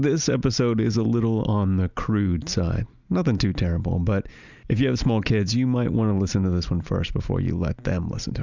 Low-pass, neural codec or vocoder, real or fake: 7.2 kHz; none; real